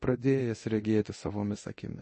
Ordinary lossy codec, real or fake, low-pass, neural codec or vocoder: MP3, 32 kbps; fake; 10.8 kHz; codec, 24 kHz, 0.9 kbps, DualCodec